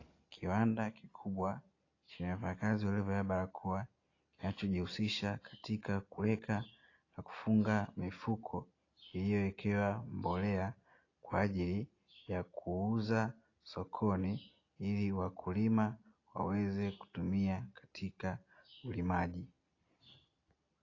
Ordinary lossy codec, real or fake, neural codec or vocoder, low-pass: AAC, 32 kbps; real; none; 7.2 kHz